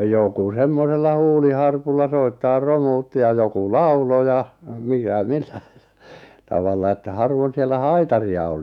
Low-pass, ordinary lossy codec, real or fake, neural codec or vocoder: 19.8 kHz; none; fake; autoencoder, 48 kHz, 128 numbers a frame, DAC-VAE, trained on Japanese speech